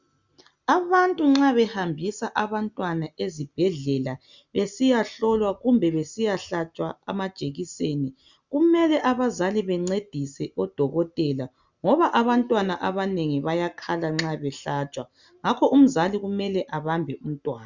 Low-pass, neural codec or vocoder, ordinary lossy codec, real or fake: 7.2 kHz; none; Opus, 64 kbps; real